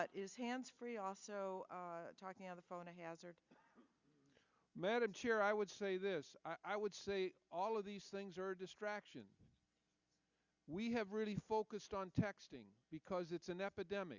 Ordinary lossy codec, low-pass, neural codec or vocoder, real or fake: Opus, 64 kbps; 7.2 kHz; none; real